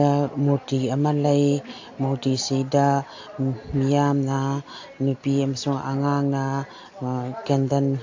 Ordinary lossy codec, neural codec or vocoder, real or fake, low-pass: AAC, 48 kbps; none; real; 7.2 kHz